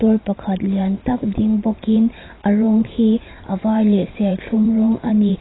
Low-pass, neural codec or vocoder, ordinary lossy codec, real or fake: 7.2 kHz; vocoder, 44.1 kHz, 128 mel bands every 512 samples, BigVGAN v2; AAC, 16 kbps; fake